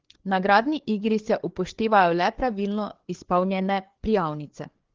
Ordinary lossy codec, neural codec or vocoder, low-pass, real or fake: Opus, 16 kbps; codec, 16 kHz, 4 kbps, FreqCodec, larger model; 7.2 kHz; fake